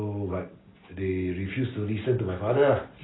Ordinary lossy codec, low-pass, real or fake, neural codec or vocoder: AAC, 16 kbps; 7.2 kHz; real; none